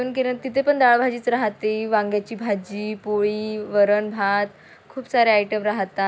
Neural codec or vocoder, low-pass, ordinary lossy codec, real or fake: none; none; none; real